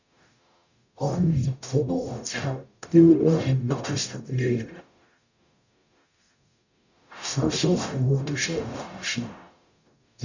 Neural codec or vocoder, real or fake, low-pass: codec, 44.1 kHz, 0.9 kbps, DAC; fake; 7.2 kHz